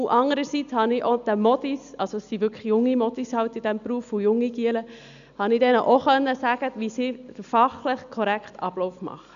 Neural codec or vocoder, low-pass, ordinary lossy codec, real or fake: none; 7.2 kHz; none; real